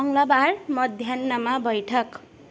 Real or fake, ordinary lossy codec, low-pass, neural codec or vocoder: real; none; none; none